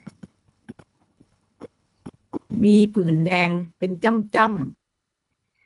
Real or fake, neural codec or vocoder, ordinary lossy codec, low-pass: fake; codec, 24 kHz, 1.5 kbps, HILCodec; none; 10.8 kHz